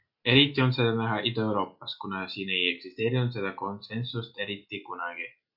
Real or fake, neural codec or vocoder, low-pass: real; none; 5.4 kHz